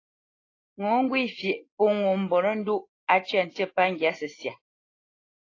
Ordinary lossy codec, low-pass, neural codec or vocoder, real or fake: AAC, 32 kbps; 7.2 kHz; none; real